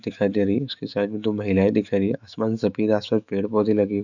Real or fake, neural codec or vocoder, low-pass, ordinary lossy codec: fake; autoencoder, 48 kHz, 128 numbers a frame, DAC-VAE, trained on Japanese speech; 7.2 kHz; none